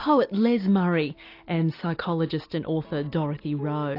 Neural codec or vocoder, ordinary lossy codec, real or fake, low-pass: none; AAC, 32 kbps; real; 5.4 kHz